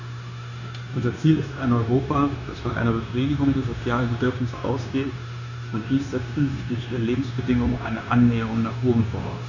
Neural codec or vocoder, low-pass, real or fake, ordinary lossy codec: codec, 16 kHz, 0.9 kbps, LongCat-Audio-Codec; 7.2 kHz; fake; none